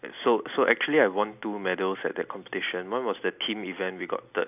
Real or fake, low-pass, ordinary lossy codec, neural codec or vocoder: real; 3.6 kHz; none; none